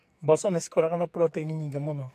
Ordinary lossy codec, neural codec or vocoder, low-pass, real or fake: AAC, 64 kbps; codec, 44.1 kHz, 2.6 kbps, SNAC; 14.4 kHz; fake